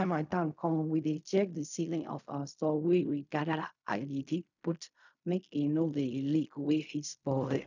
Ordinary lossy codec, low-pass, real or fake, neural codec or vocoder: none; 7.2 kHz; fake; codec, 16 kHz in and 24 kHz out, 0.4 kbps, LongCat-Audio-Codec, fine tuned four codebook decoder